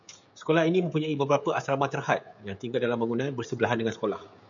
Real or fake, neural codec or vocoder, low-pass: fake; codec, 16 kHz, 16 kbps, FreqCodec, smaller model; 7.2 kHz